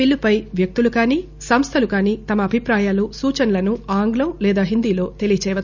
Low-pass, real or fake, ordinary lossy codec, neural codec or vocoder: 7.2 kHz; real; none; none